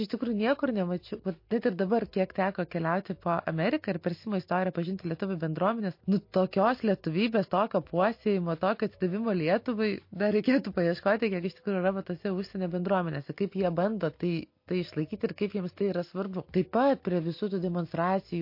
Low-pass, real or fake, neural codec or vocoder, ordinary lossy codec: 5.4 kHz; real; none; MP3, 32 kbps